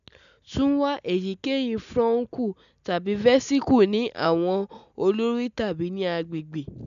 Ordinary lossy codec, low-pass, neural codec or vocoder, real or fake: none; 7.2 kHz; none; real